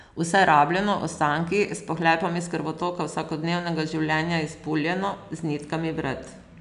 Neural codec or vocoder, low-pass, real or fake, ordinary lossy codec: none; 10.8 kHz; real; none